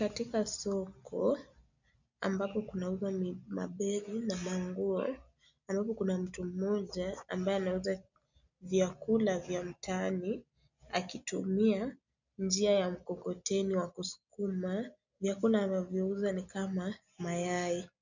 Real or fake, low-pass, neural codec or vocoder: real; 7.2 kHz; none